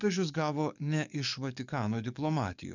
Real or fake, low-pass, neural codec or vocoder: fake; 7.2 kHz; codec, 44.1 kHz, 7.8 kbps, DAC